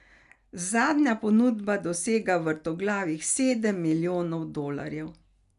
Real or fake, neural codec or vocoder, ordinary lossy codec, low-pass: real; none; none; 10.8 kHz